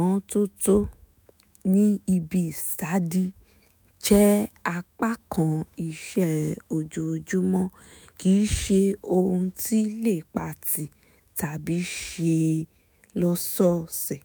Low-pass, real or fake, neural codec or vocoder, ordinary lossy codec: none; fake; autoencoder, 48 kHz, 128 numbers a frame, DAC-VAE, trained on Japanese speech; none